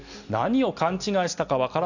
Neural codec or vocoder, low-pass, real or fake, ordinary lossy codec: none; 7.2 kHz; real; none